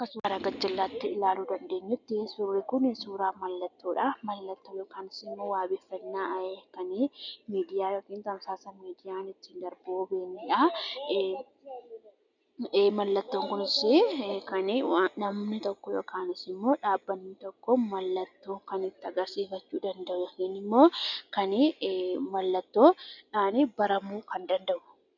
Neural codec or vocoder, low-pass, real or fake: none; 7.2 kHz; real